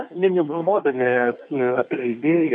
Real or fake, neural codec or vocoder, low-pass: fake; codec, 24 kHz, 1 kbps, SNAC; 9.9 kHz